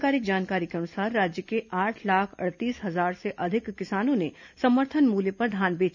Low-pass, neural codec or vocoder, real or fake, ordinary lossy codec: 7.2 kHz; none; real; none